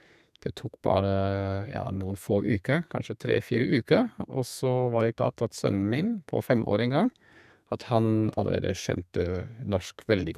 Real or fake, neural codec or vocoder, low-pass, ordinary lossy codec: fake; codec, 32 kHz, 1.9 kbps, SNAC; 14.4 kHz; none